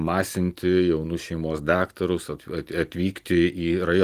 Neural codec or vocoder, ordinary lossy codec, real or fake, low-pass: none; Opus, 24 kbps; real; 14.4 kHz